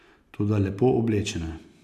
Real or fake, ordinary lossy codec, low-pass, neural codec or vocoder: real; AAC, 96 kbps; 14.4 kHz; none